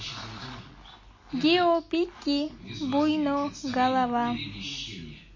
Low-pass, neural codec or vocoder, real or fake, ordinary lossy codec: 7.2 kHz; none; real; MP3, 32 kbps